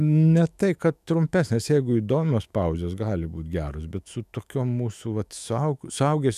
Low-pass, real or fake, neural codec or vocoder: 14.4 kHz; fake; autoencoder, 48 kHz, 128 numbers a frame, DAC-VAE, trained on Japanese speech